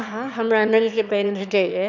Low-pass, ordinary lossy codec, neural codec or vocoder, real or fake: 7.2 kHz; none; autoencoder, 22.05 kHz, a latent of 192 numbers a frame, VITS, trained on one speaker; fake